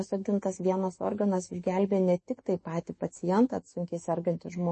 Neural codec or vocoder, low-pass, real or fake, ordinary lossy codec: autoencoder, 48 kHz, 128 numbers a frame, DAC-VAE, trained on Japanese speech; 10.8 kHz; fake; MP3, 32 kbps